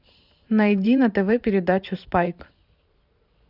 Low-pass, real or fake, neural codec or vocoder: 5.4 kHz; fake; vocoder, 44.1 kHz, 128 mel bands every 512 samples, BigVGAN v2